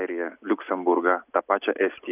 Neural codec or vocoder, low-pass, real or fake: none; 3.6 kHz; real